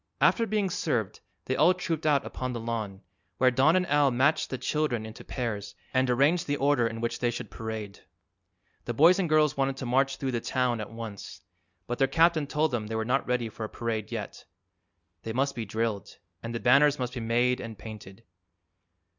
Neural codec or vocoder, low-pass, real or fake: none; 7.2 kHz; real